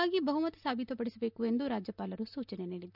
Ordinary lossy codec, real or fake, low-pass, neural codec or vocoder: none; real; 5.4 kHz; none